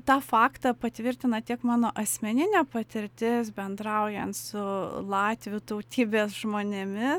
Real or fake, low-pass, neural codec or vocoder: real; 19.8 kHz; none